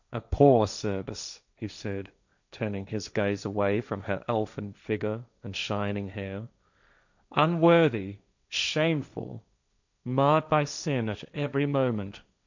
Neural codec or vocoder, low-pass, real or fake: codec, 16 kHz, 1.1 kbps, Voila-Tokenizer; 7.2 kHz; fake